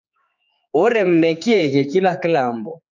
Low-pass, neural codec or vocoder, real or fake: 7.2 kHz; codec, 16 kHz, 4 kbps, X-Codec, HuBERT features, trained on general audio; fake